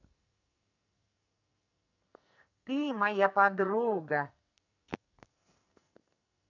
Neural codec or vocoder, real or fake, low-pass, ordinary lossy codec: codec, 44.1 kHz, 2.6 kbps, SNAC; fake; 7.2 kHz; none